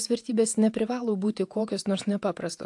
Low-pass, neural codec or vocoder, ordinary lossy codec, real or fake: 10.8 kHz; vocoder, 48 kHz, 128 mel bands, Vocos; AAC, 64 kbps; fake